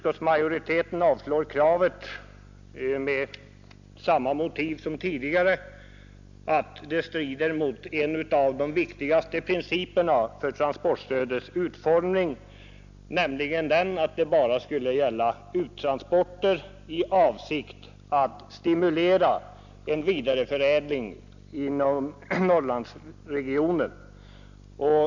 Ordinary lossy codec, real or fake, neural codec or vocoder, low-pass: none; real; none; 7.2 kHz